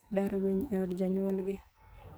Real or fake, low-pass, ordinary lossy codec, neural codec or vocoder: fake; none; none; codec, 44.1 kHz, 2.6 kbps, SNAC